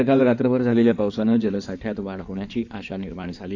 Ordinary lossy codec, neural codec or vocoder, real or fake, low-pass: none; codec, 16 kHz in and 24 kHz out, 2.2 kbps, FireRedTTS-2 codec; fake; 7.2 kHz